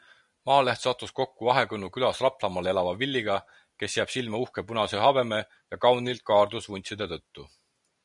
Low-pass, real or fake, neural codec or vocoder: 10.8 kHz; real; none